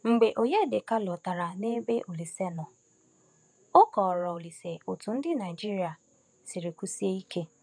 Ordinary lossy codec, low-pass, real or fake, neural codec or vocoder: none; 9.9 kHz; fake; vocoder, 48 kHz, 128 mel bands, Vocos